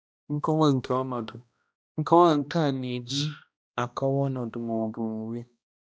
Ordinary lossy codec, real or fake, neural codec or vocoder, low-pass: none; fake; codec, 16 kHz, 1 kbps, X-Codec, HuBERT features, trained on balanced general audio; none